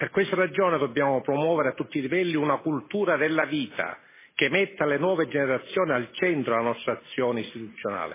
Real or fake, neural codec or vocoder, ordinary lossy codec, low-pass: real; none; MP3, 16 kbps; 3.6 kHz